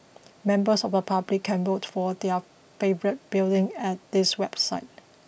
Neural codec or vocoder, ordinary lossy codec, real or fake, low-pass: none; none; real; none